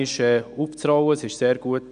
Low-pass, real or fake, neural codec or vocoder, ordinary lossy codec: 9.9 kHz; real; none; none